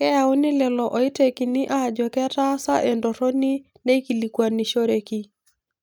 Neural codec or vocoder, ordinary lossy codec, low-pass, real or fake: none; none; none; real